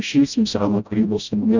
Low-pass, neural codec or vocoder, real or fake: 7.2 kHz; codec, 16 kHz, 0.5 kbps, FreqCodec, smaller model; fake